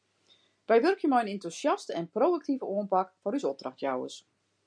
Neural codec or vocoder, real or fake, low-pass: none; real; 9.9 kHz